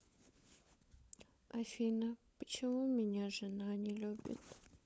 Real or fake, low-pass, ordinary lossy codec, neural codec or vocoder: fake; none; none; codec, 16 kHz, 16 kbps, FunCodec, trained on LibriTTS, 50 frames a second